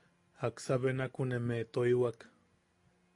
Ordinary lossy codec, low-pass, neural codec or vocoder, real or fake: AAC, 48 kbps; 10.8 kHz; none; real